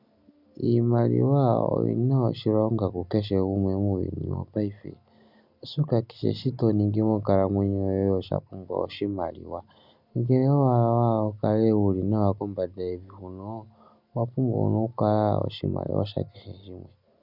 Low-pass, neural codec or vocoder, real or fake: 5.4 kHz; none; real